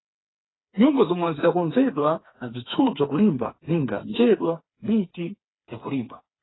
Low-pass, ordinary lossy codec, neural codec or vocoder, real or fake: 7.2 kHz; AAC, 16 kbps; codec, 16 kHz, 4 kbps, FreqCodec, smaller model; fake